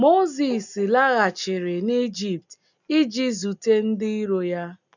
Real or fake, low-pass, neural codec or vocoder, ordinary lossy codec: real; 7.2 kHz; none; none